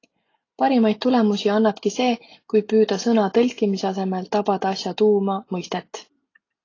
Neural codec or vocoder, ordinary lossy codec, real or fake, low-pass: none; AAC, 32 kbps; real; 7.2 kHz